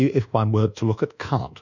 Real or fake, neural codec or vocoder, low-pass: fake; codec, 24 kHz, 1.2 kbps, DualCodec; 7.2 kHz